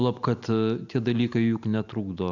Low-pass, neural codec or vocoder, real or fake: 7.2 kHz; none; real